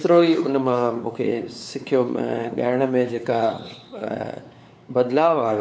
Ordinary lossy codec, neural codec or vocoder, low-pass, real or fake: none; codec, 16 kHz, 4 kbps, X-Codec, WavLM features, trained on Multilingual LibriSpeech; none; fake